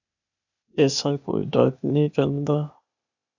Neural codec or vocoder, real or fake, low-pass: codec, 16 kHz, 0.8 kbps, ZipCodec; fake; 7.2 kHz